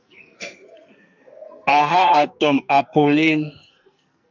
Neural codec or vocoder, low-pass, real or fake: codec, 44.1 kHz, 2.6 kbps, SNAC; 7.2 kHz; fake